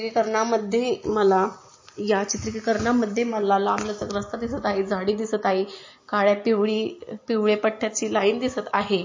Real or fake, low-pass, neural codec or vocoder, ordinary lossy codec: real; 7.2 kHz; none; MP3, 32 kbps